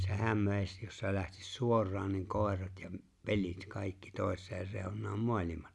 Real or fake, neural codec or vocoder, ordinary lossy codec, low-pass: real; none; none; none